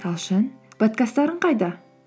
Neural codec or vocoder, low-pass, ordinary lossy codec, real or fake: none; none; none; real